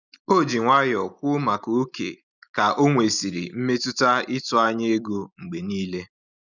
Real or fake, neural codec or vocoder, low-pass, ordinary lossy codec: real; none; 7.2 kHz; none